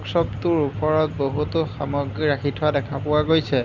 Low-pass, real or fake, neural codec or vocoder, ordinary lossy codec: 7.2 kHz; real; none; none